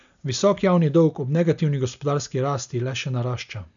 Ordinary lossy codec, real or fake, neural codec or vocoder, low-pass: none; real; none; 7.2 kHz